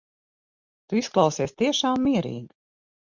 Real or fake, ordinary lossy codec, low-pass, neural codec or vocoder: real; MP3, 64 kbps; 7.2 kHz; none